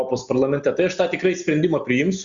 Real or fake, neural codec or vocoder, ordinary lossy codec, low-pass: real; none; Opus, 64 kbps; 7.2 kHz